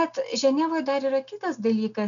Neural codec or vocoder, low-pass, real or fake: none; 7.2 kHz; real